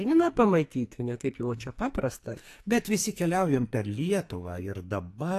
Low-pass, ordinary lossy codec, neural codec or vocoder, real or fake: 14.4 kHz; AAC, 64 kbps; codec, 44.1 kHz, 2.6 kbps, SNAC; fake